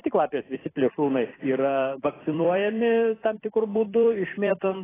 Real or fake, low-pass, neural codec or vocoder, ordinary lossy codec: fake; 3.6 kHz; vocoder, 44.1 kHz, 128 mel bands every 256 samples, BigVGAN v2; AAC, 16 kbps